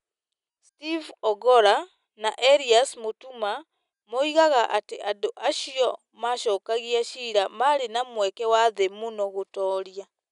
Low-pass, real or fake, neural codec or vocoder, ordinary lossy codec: 10.8 kHz; real; none; none